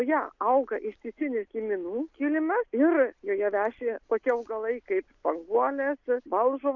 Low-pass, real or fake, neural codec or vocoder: 7.2 kHz; real; none